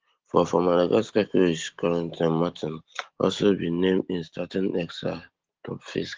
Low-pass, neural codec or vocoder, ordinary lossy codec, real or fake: 7.2 kHz; none; Opus, 16 kbps; real